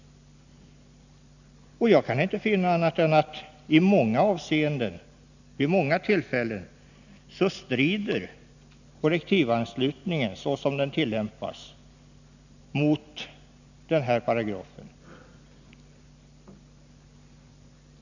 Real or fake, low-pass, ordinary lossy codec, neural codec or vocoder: real; 7.2 kHz; none; none